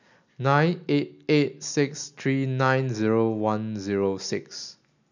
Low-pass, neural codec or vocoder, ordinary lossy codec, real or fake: 7.2 kHz; none; none; real